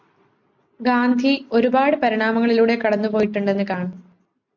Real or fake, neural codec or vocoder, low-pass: real; none; 7.2 kHz